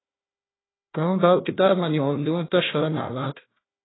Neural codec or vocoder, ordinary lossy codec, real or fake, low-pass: codec, 16 kHz, 1 kbps, FunCodec, trained on Chinese and English, 50 frames a second; AAC, 16 kbps; fake; 7.2 kHz